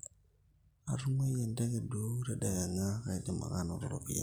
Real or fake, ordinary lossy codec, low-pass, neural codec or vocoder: real; none; none; none